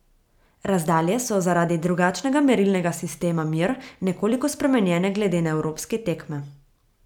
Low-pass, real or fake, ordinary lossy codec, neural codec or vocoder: 19.8 kHz; real; none; none